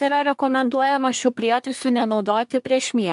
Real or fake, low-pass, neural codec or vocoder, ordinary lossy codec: fake; 10.8 kHz; codec, 24 kHz, 1 kbps, SNAC; MP3, 64 kbps